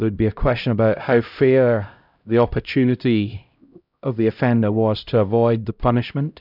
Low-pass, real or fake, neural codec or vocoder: 5.4 kHz; fake; codec, 16 kHz, 0.5 kbps, X-Codec, HuBERT features, trained on LibriSpeech